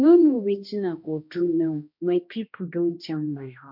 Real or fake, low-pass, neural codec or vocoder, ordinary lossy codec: fake; 5.4 kHz; codec, 16 kHz, 1 kbps, X-Codec, HuBERT features, trained on balanced general audio; MP3, 48 kbps